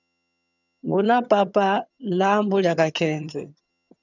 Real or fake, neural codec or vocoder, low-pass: fake; vocoder, 22.05 kHz, 80 mel bands, HiFi-GAN; 7.2 kHz